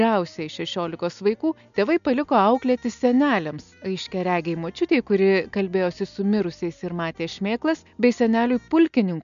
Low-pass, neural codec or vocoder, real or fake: 7.2 kHz; none; real